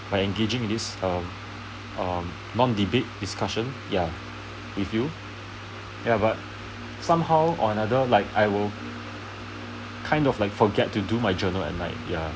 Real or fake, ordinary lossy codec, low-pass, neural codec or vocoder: real; none; none; none